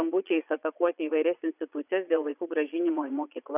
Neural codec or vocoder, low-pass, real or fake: vocoder, 22.05 kHz, 80 mel bands, Vocos; 3.6 kHz; fake